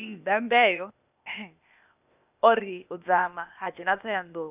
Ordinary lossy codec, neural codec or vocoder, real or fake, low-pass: none; codec, 16 kHz, 0.8 kbps, ZipCodec; fake; 3.6 kHz